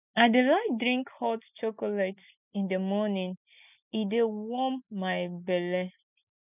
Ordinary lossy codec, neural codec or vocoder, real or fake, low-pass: none; none; real; 3.6 kHz